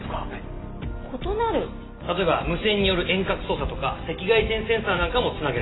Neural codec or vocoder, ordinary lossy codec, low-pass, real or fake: none; AAC, 16 kbps; 7.2 kHz; real